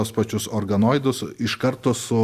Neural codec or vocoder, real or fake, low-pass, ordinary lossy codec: none; real; 14.4 kHz; Opus, 64 kbps